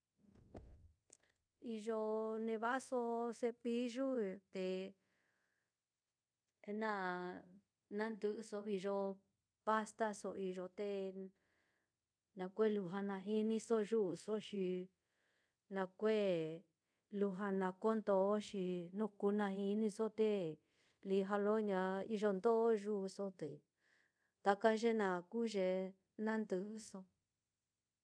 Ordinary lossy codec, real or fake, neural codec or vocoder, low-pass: none; fake; codec, 24 kHz, 0.5 kbps, DualCodec; 9.9 kHz